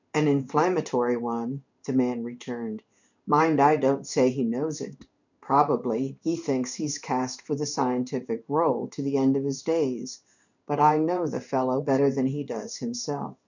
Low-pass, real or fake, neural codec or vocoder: 7.2 kHz; fake; codec, 16 kHz in and 24 kHz out, 1 kbps, XY-Tokenizer